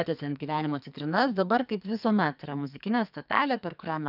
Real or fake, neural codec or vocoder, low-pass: fake; codec, 44.1 kHz, 2.6 kbps, SNAC; 5.4 kHz